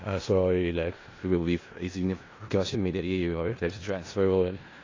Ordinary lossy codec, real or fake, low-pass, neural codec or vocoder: AAC, 32 kbps; fake; 7.2 kHz; codec, 16 kHz in and 24 kHz out, 0.4 kbps, LongCat-Audio-Codec, four codebook decoder